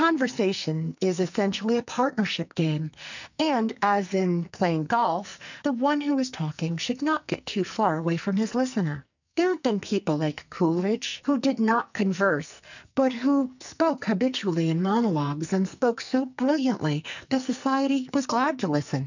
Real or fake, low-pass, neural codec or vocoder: fake; 7.2 kHz; codec, 44.1 kHz, 2.6 kbps, SNAC